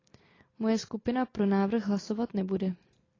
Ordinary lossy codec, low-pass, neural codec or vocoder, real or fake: AAC, 32 kbps; 7.2 kHz; none; real